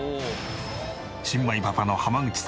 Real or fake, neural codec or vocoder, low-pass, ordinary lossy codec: real; none; none; none